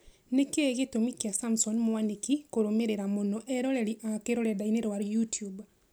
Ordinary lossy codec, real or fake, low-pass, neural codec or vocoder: none; real; none; none